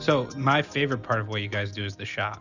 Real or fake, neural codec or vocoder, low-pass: real; none; 7.2 kHz